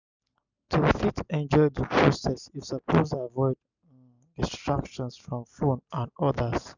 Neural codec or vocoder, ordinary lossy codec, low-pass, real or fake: none; none; 7.2 kHz; real